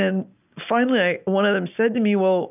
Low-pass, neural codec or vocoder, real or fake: 3.6 kHz; none; real